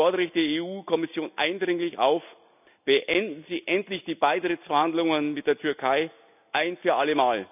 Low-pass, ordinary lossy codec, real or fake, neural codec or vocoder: 3.6 kHz; none; real; none